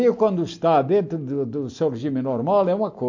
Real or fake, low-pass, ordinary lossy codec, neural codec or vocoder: real; 7.2 kHz; MP3, 48 kbps; none